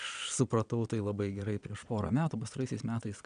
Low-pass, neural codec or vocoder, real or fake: 9.9 kHz; vocoder, 22.05 kHz, 80 mel bands, Vocos; fake